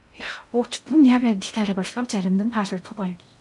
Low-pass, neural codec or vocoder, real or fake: 10.8 kHz; codec, 16 kHz in and 24 kHz out, 0.6 kbps, FocalCodec, streaming, 2048 codes; fake